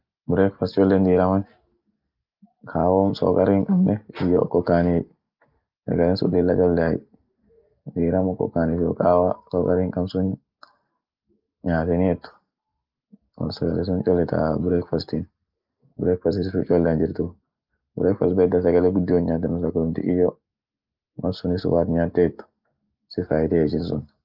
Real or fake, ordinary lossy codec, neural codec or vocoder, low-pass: real; Opus, 32 kbps; none; 5.4 kHz